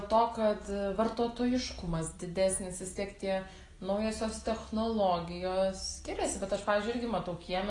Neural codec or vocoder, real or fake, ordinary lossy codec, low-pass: none; real; AAC, 32 kbps; 10.8 kHz